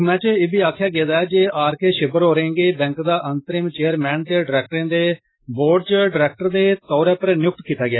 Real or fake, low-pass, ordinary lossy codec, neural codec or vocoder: real; 7.2 kHz; AAC, 16 kbps; none